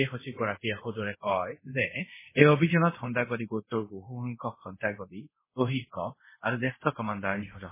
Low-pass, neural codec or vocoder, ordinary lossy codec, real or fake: 3.6 kHz; codec, 24 kHz, 0.5 kbps, DualCodec; MP3, 16 kbps; fake